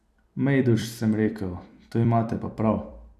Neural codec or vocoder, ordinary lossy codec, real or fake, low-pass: vocoder, 44.1 kHz, 128 mel bands every 256 samples, BigVGAN v2; none; fake; 14.4 kHz